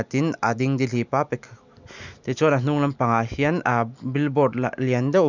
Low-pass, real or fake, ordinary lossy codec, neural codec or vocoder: 7.2 kHz; real; none; none